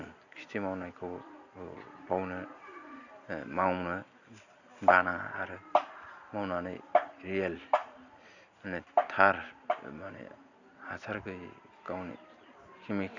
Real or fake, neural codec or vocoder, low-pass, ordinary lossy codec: real; none; 7.2 kHz; none